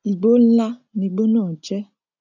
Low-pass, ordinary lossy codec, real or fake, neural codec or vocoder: 7.2 kHz; none; real; none